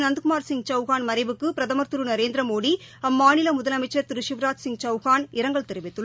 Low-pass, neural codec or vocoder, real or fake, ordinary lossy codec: none; none; real; none